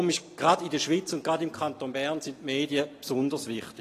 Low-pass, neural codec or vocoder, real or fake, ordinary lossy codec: 14.4 kHz; none; real; AAC, 48 kbps